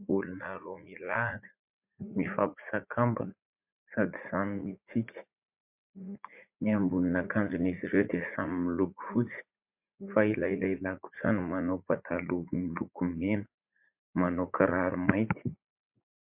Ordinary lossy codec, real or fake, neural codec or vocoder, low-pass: MP3, 32 kbps; fake; vocoder, 44.1 kHz, 80 mel bands, Vocos; 3.6 kHz